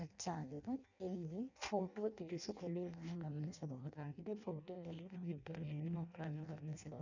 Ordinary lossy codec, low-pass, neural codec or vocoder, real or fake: none; 7.2 kHz; codec, 16 kHz in and 24 kHz out, 0.6 kbps, FireRedTTS-2 codec; fake